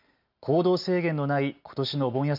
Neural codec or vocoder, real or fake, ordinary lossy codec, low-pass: none; real; none; 5.4 kHz